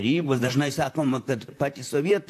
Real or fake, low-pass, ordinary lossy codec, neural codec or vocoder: fake; 14.4 kHz; AAC, 64 kbps; vocoder, 44.1 kHz, 128 mel bands, Pupu-Vocoder